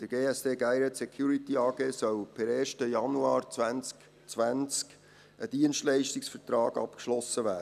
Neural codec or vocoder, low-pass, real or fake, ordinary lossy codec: none; 14.4 kHz; real; none